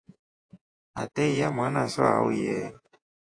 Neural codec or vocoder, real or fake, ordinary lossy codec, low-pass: vocoder, 48 kHz, 128 mel bands, Vocos; fake; AAC, 48 kbps; 9.9 kHz